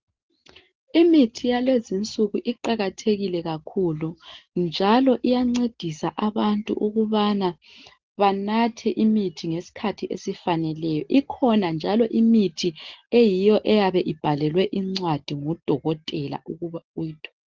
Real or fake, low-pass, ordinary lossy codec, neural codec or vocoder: real; 7.2 kHz; Opus, 16 kbps; none